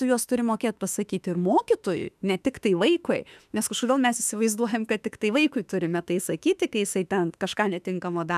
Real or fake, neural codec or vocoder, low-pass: fake; autoencoder, 48 kHz, 32 numbers a frame, DAC-VAE, trained on Japanese speech; 14.4 kHz